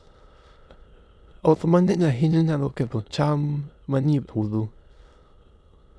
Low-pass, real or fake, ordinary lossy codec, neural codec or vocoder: none; fake; none; autoencoder, 22.05 kHz, a latent of 192 numbers a frame, VITS, trained on many speakers